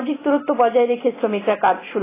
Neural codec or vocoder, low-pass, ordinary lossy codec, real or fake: none; 3.6 kHz; AAC, 16 kbps; real